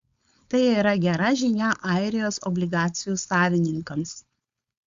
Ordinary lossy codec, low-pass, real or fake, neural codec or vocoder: Opus, 64 kbps; 7.2 kHz; fake; codec, 16 kHz, 4.8 kbps, FACodec